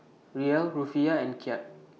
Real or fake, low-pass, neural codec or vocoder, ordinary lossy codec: real; none; none; none